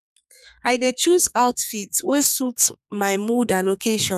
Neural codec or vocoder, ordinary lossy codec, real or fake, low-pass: codec, 32 kHz, 1.9 kbps, SNAC; none; fake; 14.4 kHz